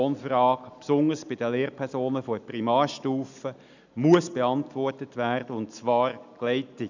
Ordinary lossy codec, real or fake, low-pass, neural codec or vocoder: none; real; 7.2 kHz; none